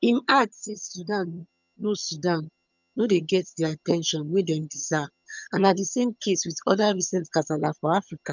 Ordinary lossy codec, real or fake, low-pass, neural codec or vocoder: none; fake; 7.2 kHz; vocoder, 22.05 kHz, 80 mel bands, HiFi-GAN